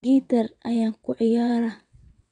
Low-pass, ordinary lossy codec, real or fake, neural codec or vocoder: 9.9 kHz; none; fake; vocoder, 22.05 kHz, 80 mel bands, WaveNeXt